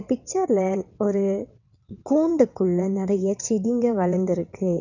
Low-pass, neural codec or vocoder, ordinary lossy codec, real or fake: 7.2 kHz; vocoder, 22.05 kHz, 80 mel bands, WaveNeXt; none; fake